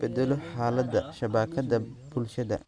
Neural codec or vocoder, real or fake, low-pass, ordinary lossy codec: none; real; 9.9 kHz; none